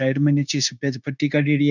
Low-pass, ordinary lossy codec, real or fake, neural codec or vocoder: 7.2 kHz; none; fake; codec, 16 kHz, 0.9 kbps, LongCat-Audio-Codec